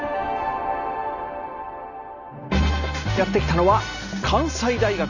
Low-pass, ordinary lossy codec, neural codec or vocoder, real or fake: 7.2 kHz; none; none; real